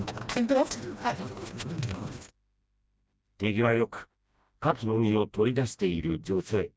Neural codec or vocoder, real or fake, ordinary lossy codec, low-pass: codec, 16 kHz, 1 kbps, FreqCodec, smaller model; fake; none; none